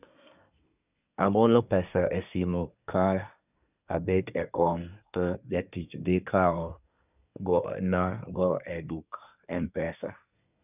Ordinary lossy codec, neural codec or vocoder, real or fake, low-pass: none; codec, 24 kHz, 1 kbps, SNAC; fake; 3.6 kHz